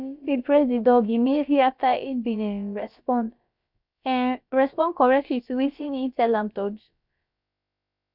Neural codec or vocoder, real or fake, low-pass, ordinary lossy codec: codec, 16 kHz, about 1 kbps, DyCAST, with the encoder's durations; fake; 5.4 kHz; none